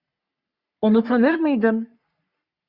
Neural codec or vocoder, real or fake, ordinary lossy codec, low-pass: codec, 44.1 kHz, 3.4 kbps, Pupu-Codec; fake; Opus, 64 kbps; 5.4 kHz